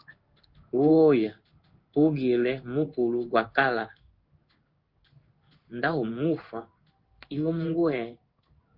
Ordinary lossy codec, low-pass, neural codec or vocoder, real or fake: Opus, 32 kbps; 5.4 kHz; codec, 16 kHz in and 24 kHz out, 1 kbps, XY-Tokenizer; fake